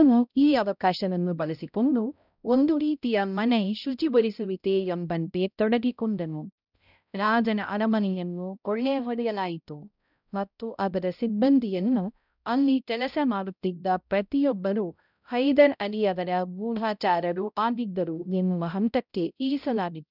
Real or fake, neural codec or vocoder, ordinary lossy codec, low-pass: fake; codec, 16 kHz, 0.5 kbps, X-Codec, HuBERT features, trained on balanced general audio; none; 5.4 kHz